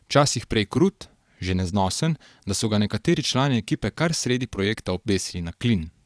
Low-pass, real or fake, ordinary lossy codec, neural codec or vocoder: none; fake; none; vocoder, 22.05 kHz, 80 mel bands, Vocos